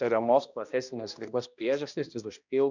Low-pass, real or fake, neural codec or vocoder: 7.2 kHz; fake; codec, 16 kHz, 1 kbps, X-Codec, HuBERT features, trained on balanced general audio